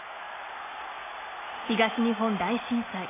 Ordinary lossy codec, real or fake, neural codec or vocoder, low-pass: AAC, 16 kbps; real; none; 3.6 kHz